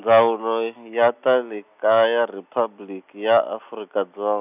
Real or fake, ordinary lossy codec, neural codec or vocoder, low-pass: real; none; none; 3.6 kHz